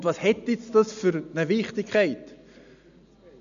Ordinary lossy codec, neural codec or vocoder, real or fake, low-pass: none; none; real; 7.2 kHz